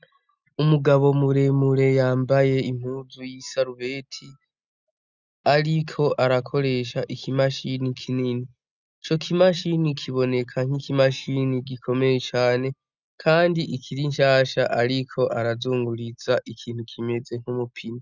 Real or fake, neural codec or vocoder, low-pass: real; none; 7.2 kHz